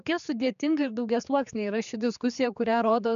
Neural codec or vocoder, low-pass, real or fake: codec, 16 kHz, 4 kbps, X-Codec, HuBERT features, trained on general audio; 7.2 kHz; fake